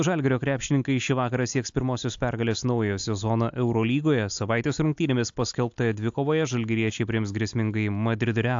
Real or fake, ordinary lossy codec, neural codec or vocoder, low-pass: real; AAC, 64 kbps; none; 7.2 kHz